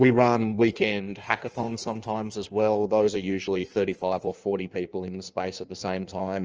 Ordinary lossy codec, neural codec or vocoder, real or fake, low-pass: Opus, 24 kbps; codec, 16 kHz in and 24 kHz out, 1.1 kbps, FireRedTTS-2 codec; fake; 7.2 kHz